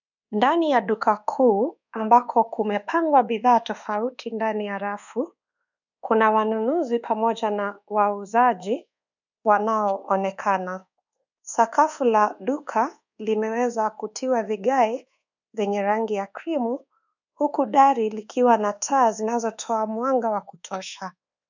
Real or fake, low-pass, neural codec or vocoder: fake; 7.2 kHz; codec, 24 kHz, 1.2 kbps, DualCodec